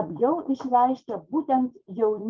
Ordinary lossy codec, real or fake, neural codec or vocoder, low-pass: Opus, 32 kbps; fake; vocoder, 44.1 kHz, 80 mel bands, Vocos; 7.2 kHz